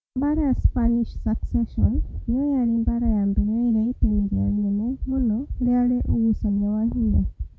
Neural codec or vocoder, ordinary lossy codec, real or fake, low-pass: none; none; real; none